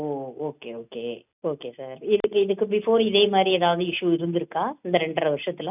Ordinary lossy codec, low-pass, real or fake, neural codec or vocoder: none; 3.6 kHz; real; none